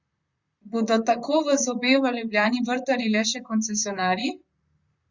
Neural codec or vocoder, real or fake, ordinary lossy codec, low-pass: vocoder, 44.1 kHz, 128 mel bands, Pupu-Vocoder; fake; Opus, 64 kbps; 7.2 kHz